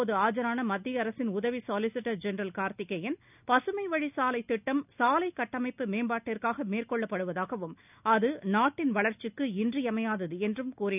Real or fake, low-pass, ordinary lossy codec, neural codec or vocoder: real; 3.6 kHz; none; none